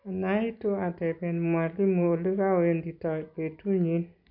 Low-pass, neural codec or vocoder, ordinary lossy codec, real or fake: 5.4 kHz; none; none; real